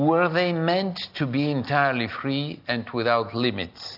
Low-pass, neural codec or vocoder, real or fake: 5.4 kHz; none; real